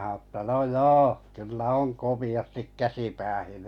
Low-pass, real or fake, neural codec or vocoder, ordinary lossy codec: 19.8 kHz; real; none; none